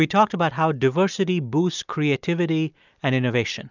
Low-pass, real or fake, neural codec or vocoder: 7.2 kHz; real; none